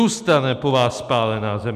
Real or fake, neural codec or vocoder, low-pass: real; none; 14.4 kHz